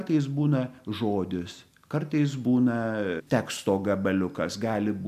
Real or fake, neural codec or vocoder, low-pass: real; none; 14.4 kHz